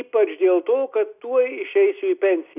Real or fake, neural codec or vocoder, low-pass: real; none; 3.6 kHz